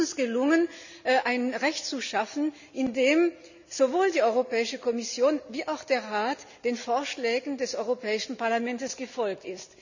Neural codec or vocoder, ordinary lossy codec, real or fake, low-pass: none; none; real; 7.2 kHz